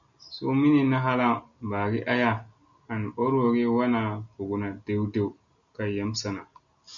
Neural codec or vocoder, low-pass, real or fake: none; 7.2 kHz; real